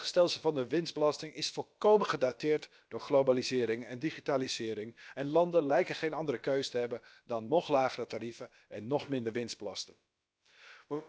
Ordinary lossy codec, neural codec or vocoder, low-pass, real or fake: none; codec, 16 kHz, about 1 kbps, DyCAST, with the encoder's durations; none; fake